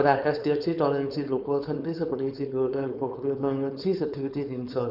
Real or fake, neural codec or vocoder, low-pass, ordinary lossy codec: fake; codec, 16 kHz, 4.8 kbps, FACodec; 5.4 kHz; none